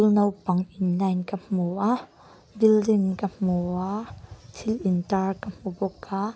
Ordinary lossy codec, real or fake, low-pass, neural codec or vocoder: none; real; none; none